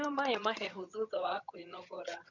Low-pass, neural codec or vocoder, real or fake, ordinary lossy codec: 7.2 kHz; vocoder, 22.05 kHz, 80 mel bands, HiFi-GAN; fake; none